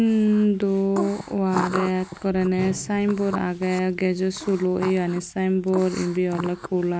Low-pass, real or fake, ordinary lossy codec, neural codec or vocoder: none; real; none; none